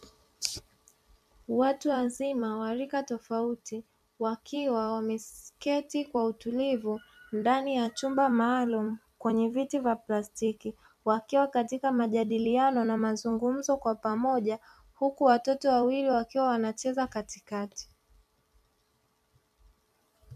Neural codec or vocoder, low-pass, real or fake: vocoder, 44.1 kHz, 128 mel bands every 256 samples, BigVGAN v2; 14.4 kHz; fake